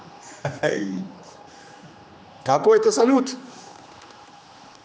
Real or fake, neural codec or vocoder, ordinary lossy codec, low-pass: fake; codec, 16 kHz, 2 kbps, X-Codec, HuBERT features, trained on general audio; none; none